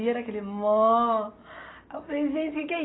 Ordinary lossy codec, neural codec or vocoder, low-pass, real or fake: AAC, 16 kbps; none; 7.2 kHz; real